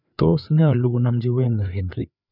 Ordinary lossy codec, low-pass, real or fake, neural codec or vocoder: none; 5.4 kHz; fake; codec, 16 kHz, 4 kbps, FreqCodec, larger model